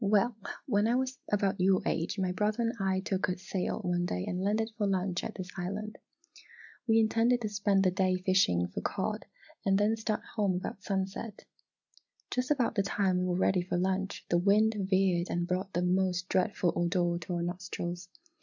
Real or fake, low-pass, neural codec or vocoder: real; 7.2 kHz; none